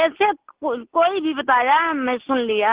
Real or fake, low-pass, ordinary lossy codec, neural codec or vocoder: real; 3.6 kHz; Opus, 16 kbps; none